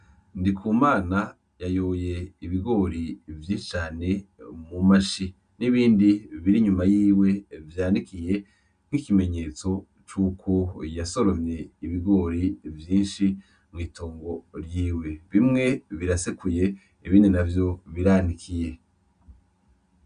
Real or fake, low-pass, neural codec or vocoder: real; 9.9 kHz; none